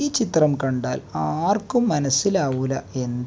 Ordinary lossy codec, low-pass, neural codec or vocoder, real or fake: none; none; none; real